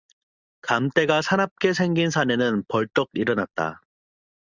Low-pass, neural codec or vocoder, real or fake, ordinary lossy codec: 7.2 kHz; none; real; Opus, 64 kbps